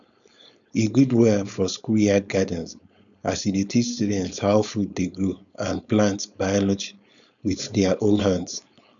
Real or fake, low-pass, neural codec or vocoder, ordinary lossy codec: fake; 7.2 kHz; codec, 16 kHz, 4.8 kbps, FACodec; none